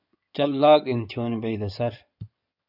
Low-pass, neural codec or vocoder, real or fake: 5.4 kHz; codec, 16 kHz in and 24 kHz out, 2.2 kbps, FireRedTTS-2 codec; fake